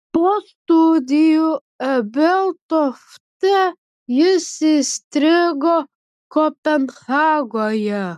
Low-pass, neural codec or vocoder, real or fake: 14.4 kHz; none; real